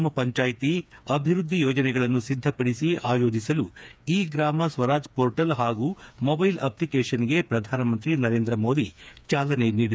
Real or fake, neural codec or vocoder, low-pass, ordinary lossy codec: fake; codec, 16 kHz, 4 kbps, FreqCodec, smaller model; none; none